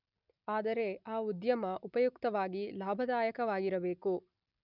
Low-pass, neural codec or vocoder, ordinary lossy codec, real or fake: 5.4 kHz; none; none; real